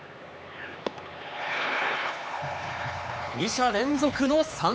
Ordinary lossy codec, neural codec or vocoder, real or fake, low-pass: none; codec, 16 kHz, 4 kbps, X-Codec, HuBERT features, trained on LibriSpeech; fake; none